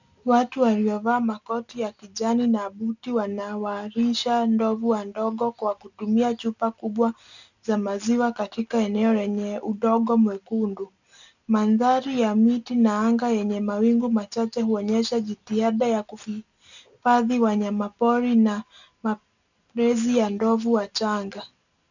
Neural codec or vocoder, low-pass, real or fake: none; 7.2 kHz; real